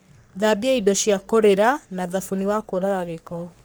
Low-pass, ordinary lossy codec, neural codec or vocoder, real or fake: none; none; codec, 44.1 kHz, 3.4 kbps, Pupu-Codec; fake